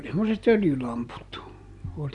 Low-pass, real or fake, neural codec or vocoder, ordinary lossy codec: 10.8 kHz; real; none; Opus, 64 kbps